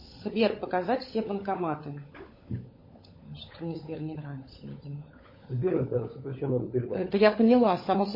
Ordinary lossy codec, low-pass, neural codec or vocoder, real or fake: MP3, 24 kbps; 5.4 kHz; codec, 16 kHz, 16 kbps, FunCodec, trained on LibriTTS, 50 frames a second; fake